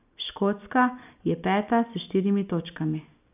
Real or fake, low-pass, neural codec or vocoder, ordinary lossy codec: real; 3.6 kHz; none; none